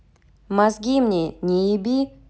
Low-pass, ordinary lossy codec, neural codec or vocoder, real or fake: none; none; none; real